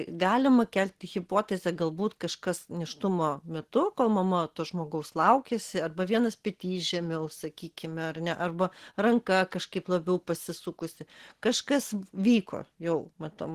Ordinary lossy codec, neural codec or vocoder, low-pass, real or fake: Opus, 16 kbps; none; 14.4 kHz; real